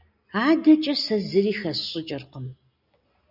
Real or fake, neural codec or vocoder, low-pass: real; none; 5.4 kHz